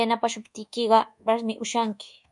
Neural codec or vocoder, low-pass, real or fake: codec, 24 kHz, 1.2 kbps, DualCodec; 10.8 kHz; fake